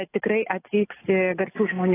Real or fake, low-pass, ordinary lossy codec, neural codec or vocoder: real; 3.6 kHz; AAC, 16 kbps; none